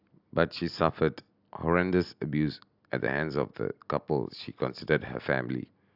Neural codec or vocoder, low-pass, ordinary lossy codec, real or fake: none; 5.4 kHz; AAC, 48 kbps; real